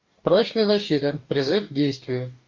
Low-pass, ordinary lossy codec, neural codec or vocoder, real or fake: 7.2 kHz; Opus, 24 kbps; codec, 44.1 kHz, 2.6 kbps, DAC; fake